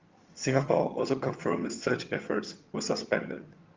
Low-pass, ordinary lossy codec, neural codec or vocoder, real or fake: 7.2 kHz; Opus, 32 kbps; vocoder, 22.05 kHz, 80 mel bands, HiFi-GAN; fake